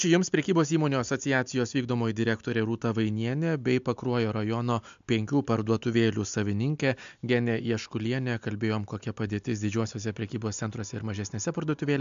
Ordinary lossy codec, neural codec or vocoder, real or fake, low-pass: MP3, 64 kbps; none; real; 7.2 kHz